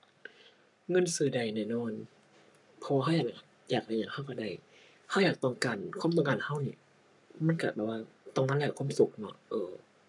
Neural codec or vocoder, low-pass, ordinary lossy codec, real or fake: codec, 44.1 kHz, 7.8 kbps, Pupu-Codec; 10.8 kHz; none; fake